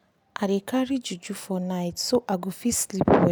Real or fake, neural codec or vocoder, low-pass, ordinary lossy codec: real; none; none; none